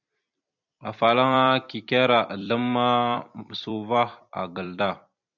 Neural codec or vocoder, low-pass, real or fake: vocoder, 44.1 kHz, 128 mel bands every 256 samples, BigVGAN v2; 7.2 kHz; fake